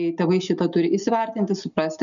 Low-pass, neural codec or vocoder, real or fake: 7.2 kHz; none; real